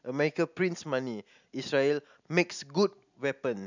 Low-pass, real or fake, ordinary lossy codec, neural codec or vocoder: 7.2 kHz; real; none; none